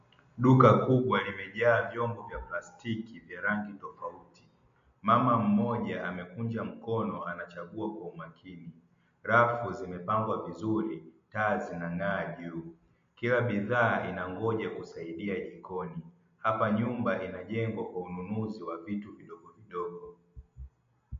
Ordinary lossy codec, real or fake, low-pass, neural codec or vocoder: MP3, 48 kbps; real; 7.2 kHz; none